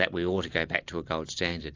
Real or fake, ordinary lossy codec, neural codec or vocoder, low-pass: real; AAC, 48 kbps; none; 7.2 kHz